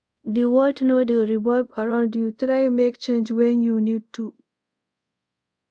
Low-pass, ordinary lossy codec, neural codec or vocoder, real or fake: 9.9 kHz; MP3, 96 kbps; codec, 24 kHz, 0.5 kbps, DualCodec; fake